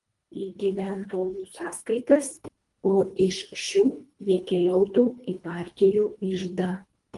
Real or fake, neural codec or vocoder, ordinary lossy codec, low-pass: fake; codec, 24 kHz, 1.5 kbps, HILCodec; Opus, 32 kbps; 10.8 kHz